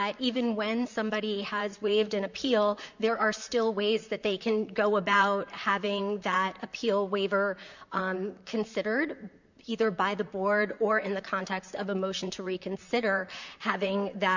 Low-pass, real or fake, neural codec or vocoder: 7.2 kHz; fake; vocoder, 44.1 kHz, 128 mel bands, Pupu-Vocoder